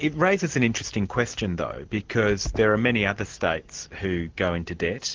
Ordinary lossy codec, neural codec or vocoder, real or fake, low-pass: Opus, 16 kbps; none; real; 7.2 kHz